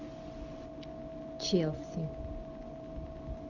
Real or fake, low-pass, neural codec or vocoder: real; 7.2 kHz; none